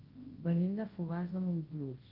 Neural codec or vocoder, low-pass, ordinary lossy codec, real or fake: codec, 24 kHz, 0.9 kbps, WavTokenizer, large speech release; 5.4 kHz; Opus, 16 kbps; fake